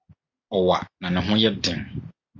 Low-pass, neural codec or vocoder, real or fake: 7.2 kHz; none; real